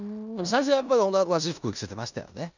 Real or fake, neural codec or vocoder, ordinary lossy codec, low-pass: fake; codec, 16 kHz in and 24 kHz out, 0.9 kbps, LongCat-Audio-Codec, four codebook decoder; none; 7.2 kHz